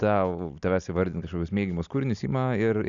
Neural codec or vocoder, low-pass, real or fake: none; 7.2 kHz; real